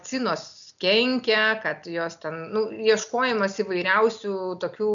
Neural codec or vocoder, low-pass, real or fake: none; 7.2 kHz; real